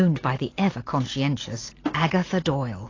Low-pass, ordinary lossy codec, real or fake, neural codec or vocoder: 7.2 kHz; AAC, 32 kbps; real; none